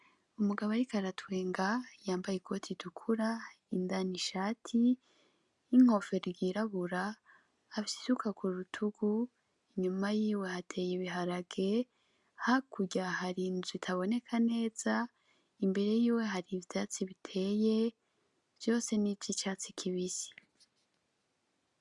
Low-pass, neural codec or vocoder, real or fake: 10.8 kHz; none; real